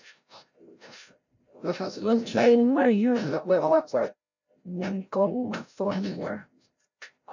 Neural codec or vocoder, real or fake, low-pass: codec, 16 kHz, 0.5 kbps, FreqCodec, larger model; fake; 7.2 kHz